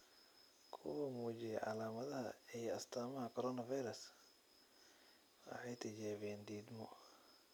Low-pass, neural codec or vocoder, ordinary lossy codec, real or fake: none; none; none; real